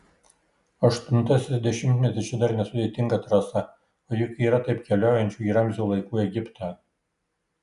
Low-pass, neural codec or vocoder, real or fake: 10.8 kHz; none; real